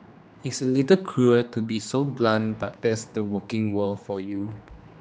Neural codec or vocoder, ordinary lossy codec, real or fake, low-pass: codec, 16 kHz, 2 kbps, X-Codec, HuBERT features, trained on general audio; none; fake; none